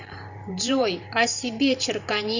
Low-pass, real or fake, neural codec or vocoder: 7.2 kHz; fake; vocoder, 44.1 kHz, 128 mel bands every 512 samples, BigVGAN v2